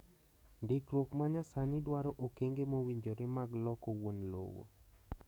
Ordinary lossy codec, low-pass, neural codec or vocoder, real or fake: none; none; codec, 44.1 kHz, 7.8 kbps, DAC; fake